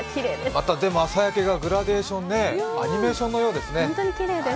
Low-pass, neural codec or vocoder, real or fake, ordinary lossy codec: none; none; real; none